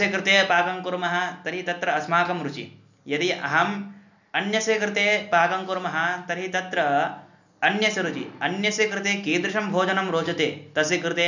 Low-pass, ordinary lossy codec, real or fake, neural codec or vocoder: 7.2 kHz; none; real; none